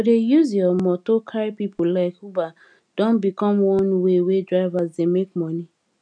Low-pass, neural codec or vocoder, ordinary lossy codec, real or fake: 9.9 kHz; none; none; real